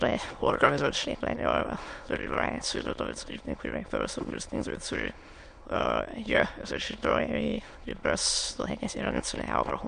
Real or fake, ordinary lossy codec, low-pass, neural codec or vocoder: fake; MP3, 64 kbps; 9.9 kHz; autoencoder, 22.05 kHz, a latent of 192 numbers a frame, VITS, trained on many speakers